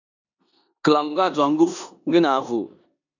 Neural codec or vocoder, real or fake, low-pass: codec, 16 kHz in and 24 kHz out, 0.9 kbps, LongCat-Audio-Codec, four codebook decoder; fake; 7.2 kHz